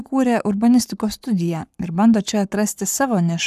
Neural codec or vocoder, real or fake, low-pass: codec, 44.1 kHz, 7.8 kbps, Pupu-Codec; fake; 14.4 kHz